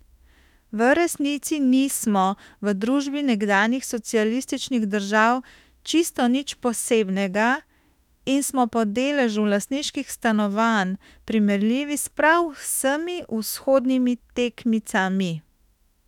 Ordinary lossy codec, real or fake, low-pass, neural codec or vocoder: none; fake; 19.8 kHz; autoencoder, 48 kHz, 32 numbers a frame, DAC-VAE, trained on Japanese speech